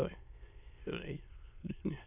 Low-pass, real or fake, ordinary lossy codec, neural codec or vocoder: 3.6 kHz; fake; AAC, 24 kbps; autoencoder, 22.05 kHz, a latent of 192 numbers a frame, VITS, trained on many speakers